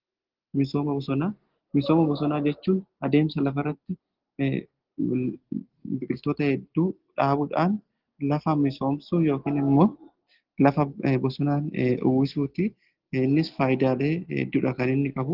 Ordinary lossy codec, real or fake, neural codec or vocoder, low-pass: Opus, 16 kbps; real; none; 5.4 kHz